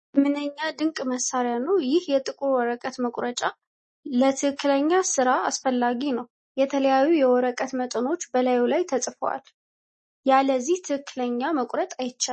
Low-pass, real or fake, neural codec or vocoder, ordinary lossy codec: 10.8 kHz; real; none; MP3, 32 kbps